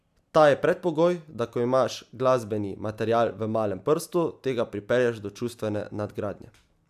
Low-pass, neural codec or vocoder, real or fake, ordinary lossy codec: 14.4 kHz; none; real; AAC, 96 kbps